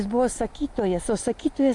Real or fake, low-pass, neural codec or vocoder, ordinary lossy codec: real; 10.8 kHz; none; AAC, 64 kbps